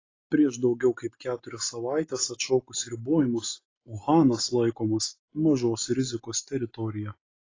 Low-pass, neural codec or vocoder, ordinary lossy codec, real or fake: 7.2 kHz; none; AAC, 32 kbps; real